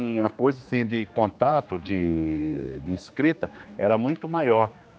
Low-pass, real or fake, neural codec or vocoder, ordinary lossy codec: none; fake; codec, 16 kHz, 2 kbps, X-Codec, HuBERT features, trained on general audio; none